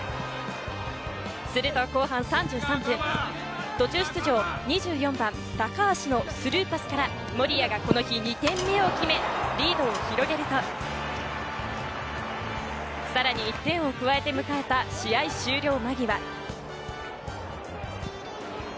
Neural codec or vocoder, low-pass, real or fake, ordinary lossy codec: none; none; real; none